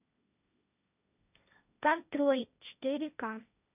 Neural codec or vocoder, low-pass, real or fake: codec, 16 kHz, 1.1 kbps, Voila-Tokenizer; 3.6 kHz; fake